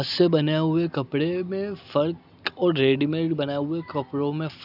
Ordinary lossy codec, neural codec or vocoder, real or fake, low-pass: none; none; real; 5.4 kHz